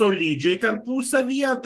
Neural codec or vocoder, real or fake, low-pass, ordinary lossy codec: codec, 32 kHz, 1.9 kbps, SNAC; fake; 14.4 kHz; Opus, 24 kbps